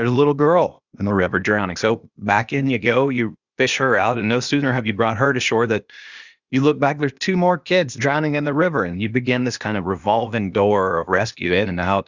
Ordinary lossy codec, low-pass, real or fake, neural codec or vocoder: Opus, 64 kbps; 7.2 kHz; fake; codec, 16 kHz, 0.8 kbps, ZipCodec